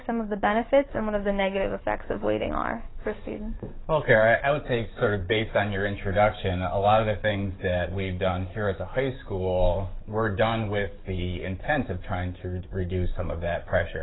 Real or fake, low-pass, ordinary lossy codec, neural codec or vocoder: fake; 7.2 kHz; AAC, 16 kbps; codec, 44.1 kHz, 7.8 kbps, DAC